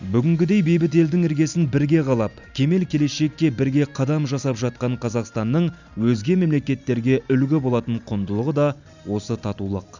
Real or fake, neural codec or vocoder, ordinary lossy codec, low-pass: real; none; none; 7.2 kHz